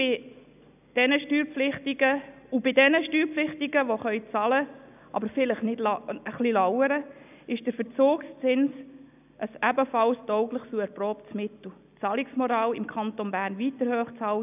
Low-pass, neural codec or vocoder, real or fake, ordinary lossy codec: 3.6 kHz; none; real; none